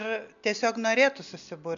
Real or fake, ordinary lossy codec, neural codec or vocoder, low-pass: real; MP3, 96 kbps; none; 7.2 kHz